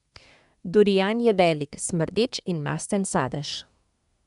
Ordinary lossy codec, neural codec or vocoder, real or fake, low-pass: none; codec, 24 kHz, 1 kbps, SNAC; fake; 10.8 kHz